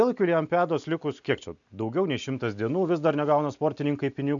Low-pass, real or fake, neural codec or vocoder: 7.2 kHz; real; none